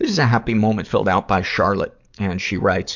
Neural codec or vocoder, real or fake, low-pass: codec, 44.1 kHz, 7.8 kbps, DAC; fake; 7.2 kHz